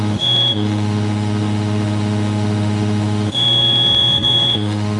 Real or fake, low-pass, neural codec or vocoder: fake; 10.8 kHz; autoencoder, 48 kHz, 32 numbers a frame, DAC-VAE, trained on Japanese speech